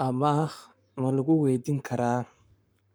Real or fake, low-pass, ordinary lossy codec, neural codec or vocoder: fake; none; none; codec, 44.1 kHz, 7.8 kbps, Pupu-Codec